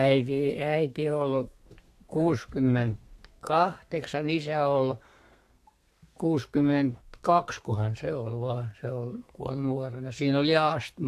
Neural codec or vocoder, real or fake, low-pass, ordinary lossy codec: codec, 32 kHz, 1.9 kbps, SNAC; fake; 14.4 kHz; AAC, 64 kbps